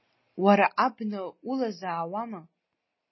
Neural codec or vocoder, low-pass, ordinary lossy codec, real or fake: none; 7.2 kHz; MP3, 24 kbps; real